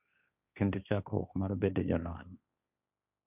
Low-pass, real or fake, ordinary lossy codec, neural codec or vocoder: 3.6 kHz; fake; none; codec, 16 kHz, 1.1 kbps, Voila-Tokenizer